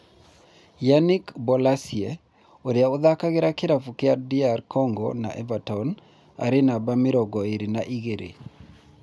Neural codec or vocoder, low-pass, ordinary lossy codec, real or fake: none; none; none; real